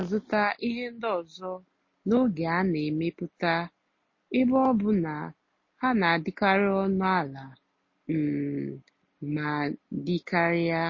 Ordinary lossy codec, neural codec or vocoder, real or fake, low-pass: MP3, 32 kbps; none; real; 7.2 kHz